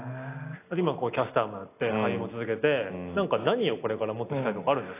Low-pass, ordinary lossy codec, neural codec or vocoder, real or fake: 3.6 kHz; AAC, 24 kbps; none; real